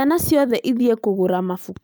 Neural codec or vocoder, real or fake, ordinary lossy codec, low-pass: none; real; none; none